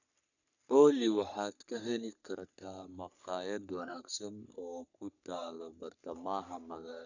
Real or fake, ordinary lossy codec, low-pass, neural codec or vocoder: fake; none; 7.2 kHz; codec, 44.1 kHz, 3.4 kbps, Pupu-Codec